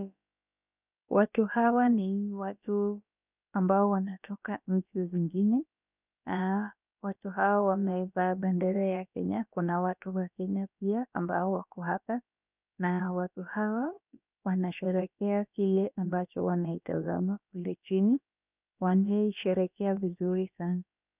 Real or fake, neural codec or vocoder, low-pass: fake; codec, 16 kHz, about 1 kbps, DyCAST, with the encoder's durations; 3.6 kHz